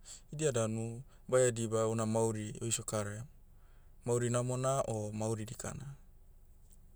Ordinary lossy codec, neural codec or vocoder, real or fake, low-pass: none; none; real; none